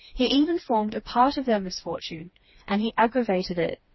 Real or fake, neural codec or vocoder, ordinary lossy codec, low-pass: fake; codec, 16 kHz, 2 kbps, FreqCodec, smaller model; MP3, 24 kbps; 7.2 kHz